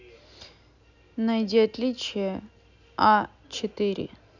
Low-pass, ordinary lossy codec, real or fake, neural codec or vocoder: 7.2 kHz; none; real; none